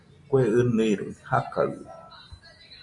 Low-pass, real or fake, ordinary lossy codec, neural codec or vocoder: 10.8 kHz; real; MP3, 48 kbps; none